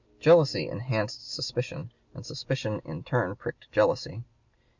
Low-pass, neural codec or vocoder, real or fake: 7.2 kHz; none; real